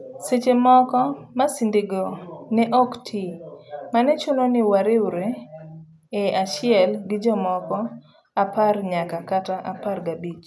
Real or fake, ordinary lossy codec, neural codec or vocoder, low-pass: real; none; none; none